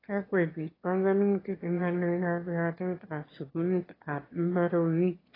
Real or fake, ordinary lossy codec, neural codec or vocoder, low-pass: fake; AAC, 24 kbps; autoencoder, 22.05 kHz, a latent of 192 numbers a frame, VITS, trained on one speaker; 5.4 kHz